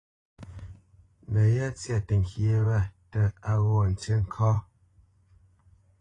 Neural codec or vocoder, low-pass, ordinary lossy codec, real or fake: vocoder, 24 kHz, 100 mel bands, Vocos; 10.8 kHz; AAC, 32 kbps; fake